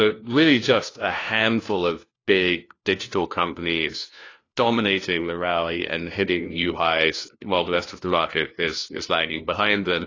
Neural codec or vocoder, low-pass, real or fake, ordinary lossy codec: codec, 16 kHz, 1 kbps, FunCodec, trained on LibriTTS, 50 frames a second; 7.2 kHz; fake; AAC, 32 kbps